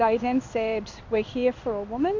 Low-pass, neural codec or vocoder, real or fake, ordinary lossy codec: 7.2 kHz; codec, 16 kHz in and 24 kHz out, 1 kbps, XY-Tokenizer; fake; MP3, 64 kbps